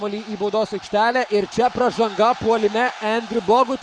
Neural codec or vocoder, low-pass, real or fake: codec, 44.1 kHz, 7.8 kbps, Pupu-Codec; 9.9 kHz; fake